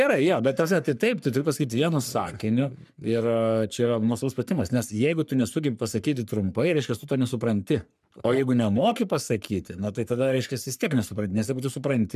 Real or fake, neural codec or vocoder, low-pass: fake; codec, 44.1 kHz, 3.4 kbps, Pupu-Codec; 14.4 kHz